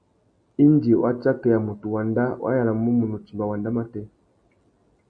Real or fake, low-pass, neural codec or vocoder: real; 9.9 kHz; none